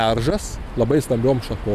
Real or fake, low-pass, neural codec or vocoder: real; 14.4 kHz; none